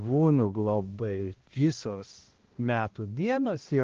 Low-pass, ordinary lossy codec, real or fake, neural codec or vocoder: 7.2 kHz; Opus, 16 kbps; fake; codec, 16 kHz, 1 kbps, X-Codec, HuBERT features, trained on balanced general audio